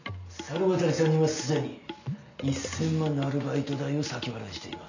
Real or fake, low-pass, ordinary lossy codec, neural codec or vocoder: real; 7.2 kHz; none; none